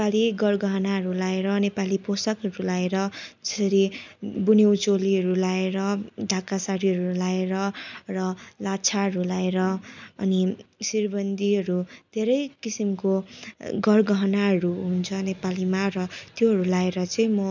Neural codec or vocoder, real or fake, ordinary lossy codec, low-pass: none; real; none; 7.2 kHz